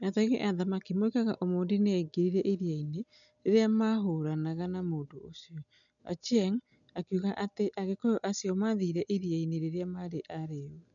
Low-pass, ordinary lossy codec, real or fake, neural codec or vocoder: 7.2 kHz; none; real; none